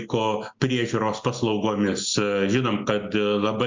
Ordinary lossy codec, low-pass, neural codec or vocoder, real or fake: MP3, 64 kbps; 7.2 kHz; none; real